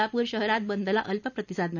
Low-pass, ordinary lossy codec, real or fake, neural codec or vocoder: 7.2 kHz; none; real; none